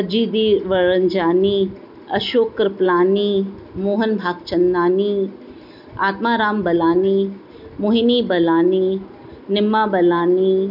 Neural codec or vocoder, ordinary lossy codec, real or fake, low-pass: none; none; real; 5.4 kHz